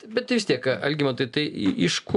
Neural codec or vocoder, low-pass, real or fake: none; 10.8 kHz; real